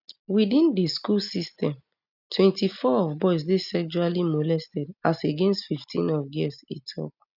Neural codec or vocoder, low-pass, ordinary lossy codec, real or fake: none; 5.4 kHz; none; real